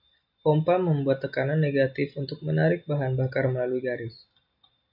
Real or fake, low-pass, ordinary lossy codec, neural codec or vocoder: real; 5.4 kHz; MP3, 48 kbps; none